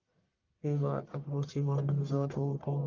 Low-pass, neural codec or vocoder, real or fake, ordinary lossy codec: 7.2 kHz; codec, 44.1 kHz, 1.7 kbps, Pupu-Codec; fake; Opus, 32 kbps